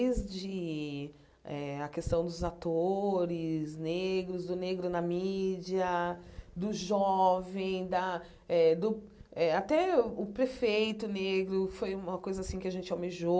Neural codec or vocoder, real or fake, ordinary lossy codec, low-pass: none; real; none; none